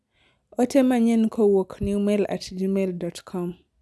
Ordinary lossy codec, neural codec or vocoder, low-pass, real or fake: none; none; none; real